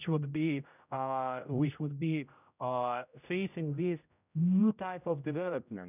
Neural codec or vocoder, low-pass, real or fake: codec, 16 kHz, 0.5 kbps, X-Codec, HuBERT features, trained on general audio; 3.6 kHz; fake